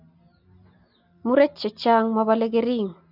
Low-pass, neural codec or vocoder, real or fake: 5.4 kHz; none; real